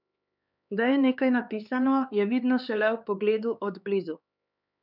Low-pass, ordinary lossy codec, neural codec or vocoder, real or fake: 5.4 kHz; none; codec, 16 kHz, 4 kbps, X-Codec, HuBERT features, trained on LibriSpeech; fake